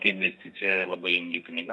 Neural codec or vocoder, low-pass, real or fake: codec, 32 kHz, 1.9 kbps, SNAC; 10.8 kHz; fake